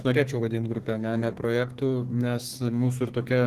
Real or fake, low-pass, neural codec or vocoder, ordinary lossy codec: fake; 14.4 kHz; codec, 32 kHz, 1.9 kbps, SNAC; Opus, 16 kbps